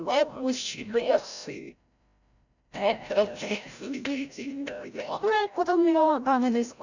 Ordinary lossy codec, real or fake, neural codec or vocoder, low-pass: none; fake; codec, 16 kHz, 0.5 kbps, FreqCodec, larger model; 7.2 kHz